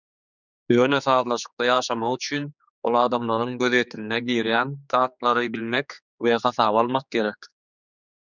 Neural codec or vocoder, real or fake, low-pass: codec, 16 kHz, 4 kbps, X-Codec, HuBERT features, trained on general audio; fake; 7.2 kHz